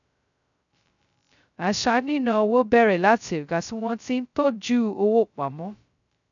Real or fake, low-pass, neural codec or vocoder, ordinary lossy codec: fake; 7.2 kHz; codec, 16 kHz, 0.2 kbps, FocalCodec; none